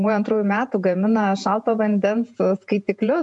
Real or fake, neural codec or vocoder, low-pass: fake; vocoder, 48 kHz, 128 mel bands, Vocos; 10.8 kHz